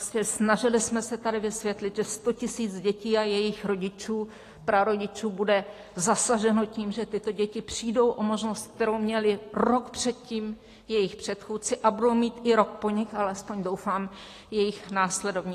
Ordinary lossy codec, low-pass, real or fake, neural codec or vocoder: AAC, 48 kbps; 14.4 kHz; real; none